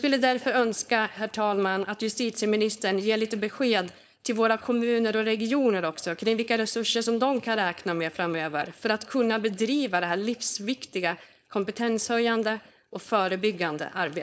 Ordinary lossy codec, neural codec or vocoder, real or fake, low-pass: none; codec, 16 kHz, 4.8 kbps, FACodec; fake; none